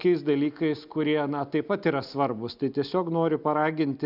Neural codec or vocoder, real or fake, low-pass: none; real; 5.4 kHz